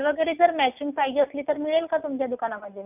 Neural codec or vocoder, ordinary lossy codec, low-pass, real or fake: none; none; 3.6 kHz; real